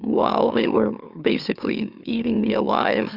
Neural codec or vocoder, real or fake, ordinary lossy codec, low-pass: autoencoder, 44.1 kHz, a latent of 192 numbers a frame, MeloTTS; fake; Opus, 64 kbps; 5.4 kHz